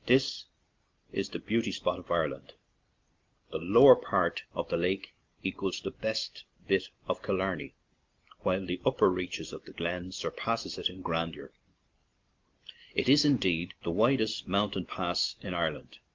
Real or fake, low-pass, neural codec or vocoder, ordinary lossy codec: real; 7.2 kHz; none; Opus, 24 kbps